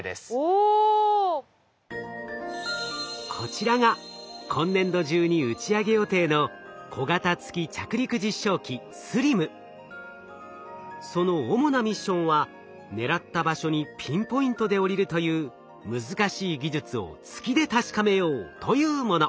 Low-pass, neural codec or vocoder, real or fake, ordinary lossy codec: none; none; real; none